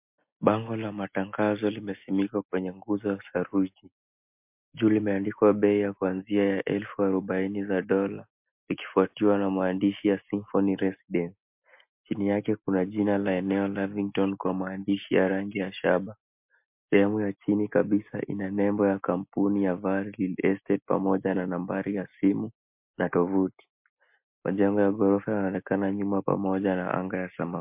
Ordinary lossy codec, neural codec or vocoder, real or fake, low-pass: MP3, 32 kbps; none; real; 3.6 kHz